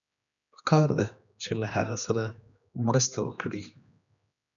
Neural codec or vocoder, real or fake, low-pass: codec, 16 kHz, 2 kbps, X-Codec, HuBERT features, trained on general audio; fake; 7.2 kHz